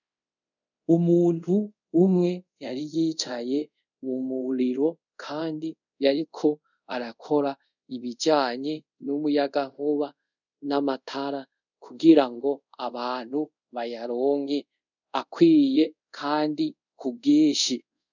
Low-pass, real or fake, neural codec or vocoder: 7.2 kHz; fake; codec, 24 kHz, 0.5 kbps, DualCodec